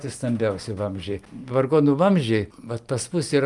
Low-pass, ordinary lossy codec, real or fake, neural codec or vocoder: 10.8 kHz; Opus, 32 kbps; real; none